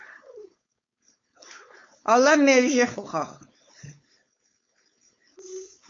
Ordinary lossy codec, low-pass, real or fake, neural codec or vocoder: MP3, 48 kbps; 7.2 kHz; fake; codec, 16 kHz, 4.8 kbps, FACodec